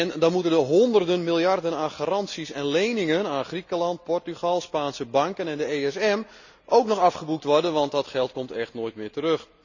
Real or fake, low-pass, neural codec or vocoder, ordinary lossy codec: real; 7.2 kHz; none; none